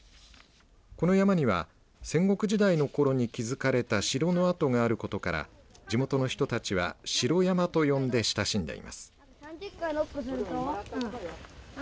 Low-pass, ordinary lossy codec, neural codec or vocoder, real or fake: none; none; none; real